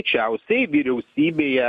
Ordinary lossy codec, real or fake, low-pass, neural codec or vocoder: MP3, 64 kbps; real; 14.4 kHz; none